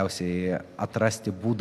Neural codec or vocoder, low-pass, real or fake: none; 14.4 kHz; real